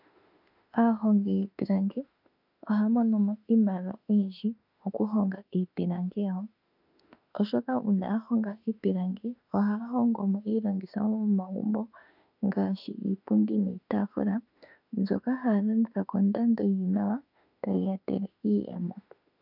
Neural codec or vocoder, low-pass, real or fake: autoencoder, 48 kHz, 32 numbers a frame, DAC-VAE, trained on Japanese speech; 5.4 kHz; fake